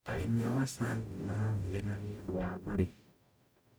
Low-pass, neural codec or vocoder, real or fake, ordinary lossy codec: none; codec, 44.1 kHz, 0.9 kbps, DAC; fake; none